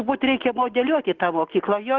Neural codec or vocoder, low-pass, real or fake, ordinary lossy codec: none; 7.2 kHz; real; Opus, 16 kbps